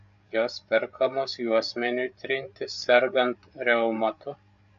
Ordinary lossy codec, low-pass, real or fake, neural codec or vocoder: MP3, 64 kbps; 7.2 kHz; fake; codec, 16 kHz, 8 kbps, FreqCodec, larger model